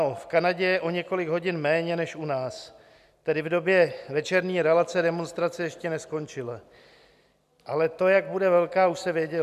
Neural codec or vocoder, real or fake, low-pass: none; real; 14.4 kHz